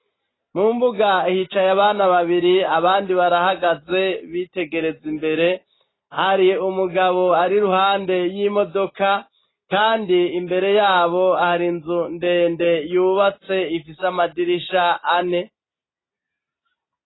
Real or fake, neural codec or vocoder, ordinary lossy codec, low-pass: real; none; AAC, 16 kbps; 7.2 kHz